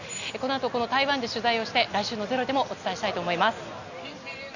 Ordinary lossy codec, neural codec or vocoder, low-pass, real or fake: none; none; 7.2 kHz; real